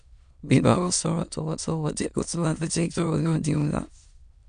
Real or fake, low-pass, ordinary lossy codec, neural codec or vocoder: fake; 9.9 kHz; none; autoencoder, 22.05 kHz, a latent of 192 numbers a frame, VITS, trained on many speakers